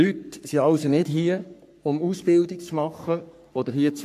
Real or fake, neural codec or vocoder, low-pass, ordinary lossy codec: fake; codec, 44.1 kHz, 3.4 kbps, Pupu-Codec; 14.4 kHz; AAC, 96 kbps